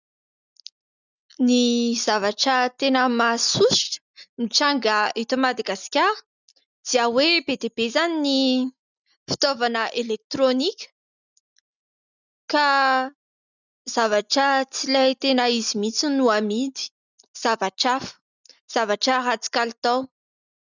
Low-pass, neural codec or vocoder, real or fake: 7.2 kHz; none; real